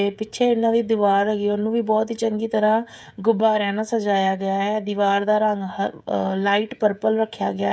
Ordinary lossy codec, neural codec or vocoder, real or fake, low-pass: none; codec, 16 kHz, 16 kbps, FreqCodec, smaller model; fake; none